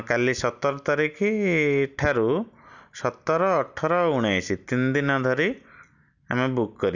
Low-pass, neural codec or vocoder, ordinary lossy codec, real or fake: 7.2 kHz; none; none; real